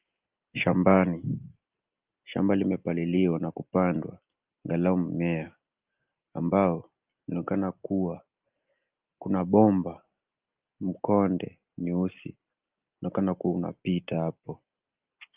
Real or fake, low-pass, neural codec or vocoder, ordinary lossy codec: real; 3.6 kHz; none; Opus, 24 kbps